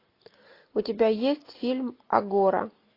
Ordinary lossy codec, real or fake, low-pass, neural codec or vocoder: AAC, 32 kbps; real; 5.4 kHz; none